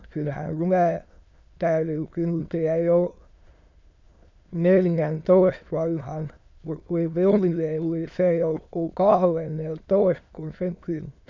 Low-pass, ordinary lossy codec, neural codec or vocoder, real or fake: 7.2 kHz; MP3, 64 kbps; autoencoder, 22.05 kHz, a latent of 192 numbers a frame, VITS, trained on many speakers; fake